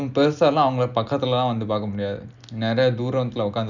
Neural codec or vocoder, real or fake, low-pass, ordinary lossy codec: none; real; 7.2 kHz; none